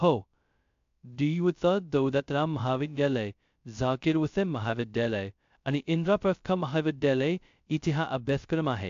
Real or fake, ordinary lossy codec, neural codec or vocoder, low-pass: fake; AAC, 64 kbps; codec, 16 kHz, 0.2 kbps, FocalCodec; 7.2 kHz